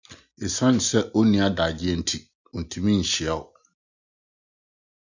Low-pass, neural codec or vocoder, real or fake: 7.2 kHz; none; real